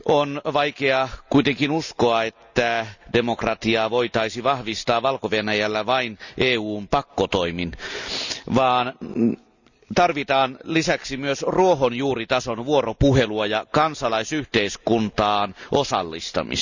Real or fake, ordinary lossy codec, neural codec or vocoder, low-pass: real; none; none; 7.2 kHz